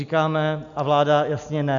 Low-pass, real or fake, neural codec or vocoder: 7.2 kHz; real; none